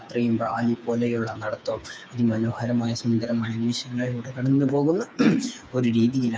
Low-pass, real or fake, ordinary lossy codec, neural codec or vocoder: none; fake; none; codec, 16 kHz, 4 kbps, FreqCodec, smaller model